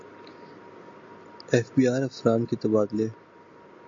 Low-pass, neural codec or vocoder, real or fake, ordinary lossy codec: 7.2 kHz; none; real; MP3, 48 kbps